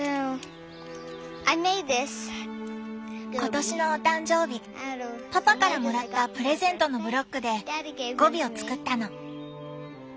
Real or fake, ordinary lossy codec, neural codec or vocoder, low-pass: real; none; none; none